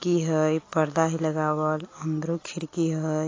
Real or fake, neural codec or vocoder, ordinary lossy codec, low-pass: real; none; AAC, 32 kbps; 7.2 kHz